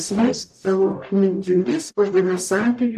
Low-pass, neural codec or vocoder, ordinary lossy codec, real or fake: 14.4 kHz; codec, 44.1 kHz, 0.9 kbps, DAC; AAC, 96 kbps; fake